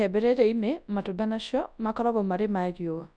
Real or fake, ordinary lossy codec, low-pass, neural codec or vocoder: fake; none; 9.9 kHz; codec, 24 kHz, 0.9 kbps, WavTokenizer, large speech release